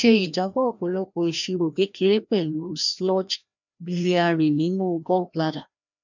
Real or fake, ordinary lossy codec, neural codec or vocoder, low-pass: fake; none; codec, 16 kHz, 1 kbps, FreqCodec, larger model; 7.2 kHz